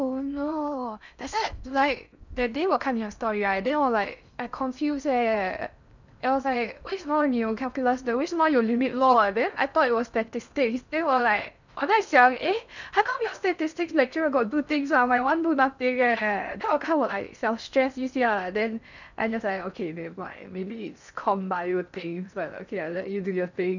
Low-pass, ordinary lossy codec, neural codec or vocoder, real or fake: 7.2 kHz; none; codec, 16 kHz in and 24 kHz out, 0.6 kbps, FocalCodec, streaming, 4096 codes; fake